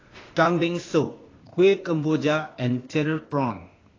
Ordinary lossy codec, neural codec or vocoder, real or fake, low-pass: AAC, 32 kbps; codec, 16 kHz, 0.8 kbps, ZipCodec; fake; 7.2 kHz